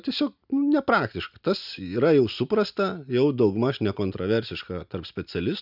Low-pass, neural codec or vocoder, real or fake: 5.4 kHz; none; real